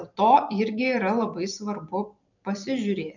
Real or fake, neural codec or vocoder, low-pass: fake; vocoder, 44.1 kHz, 128 mel bands every 256 samples, BigVGAN v2; 7.2 kHz